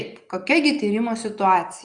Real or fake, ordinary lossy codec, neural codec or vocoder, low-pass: real; Opus, 64 kbps; none; 9.9 kHz